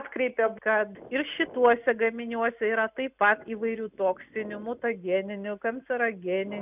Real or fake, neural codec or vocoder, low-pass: real; none; 3.6 kHz